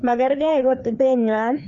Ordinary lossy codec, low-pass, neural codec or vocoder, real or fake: none; 7.2 kHz; codec, 16 kHz, 2 kbps, FreqCodec, larger model; fake